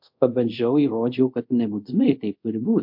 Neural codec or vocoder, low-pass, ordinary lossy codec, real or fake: codec, 24 kHz, 0.5 kbps, DualCodec; 5.4 kHz; MP3, 48 kbps; fake